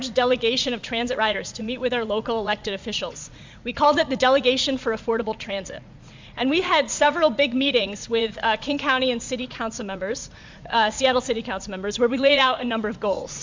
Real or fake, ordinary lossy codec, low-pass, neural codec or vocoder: fake; MP3, 64 kbps; 7.2 kHz; vocoder, 44.1 kHz, 80 mel bands, Vocos